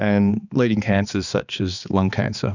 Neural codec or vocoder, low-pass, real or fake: codec, 16 kHz, 2 kbps, X-Codec, HuBERT features, trained on balanced general audio; 7.2 kHz; fake